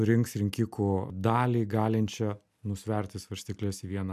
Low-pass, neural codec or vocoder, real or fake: 14.4 kHz; none; real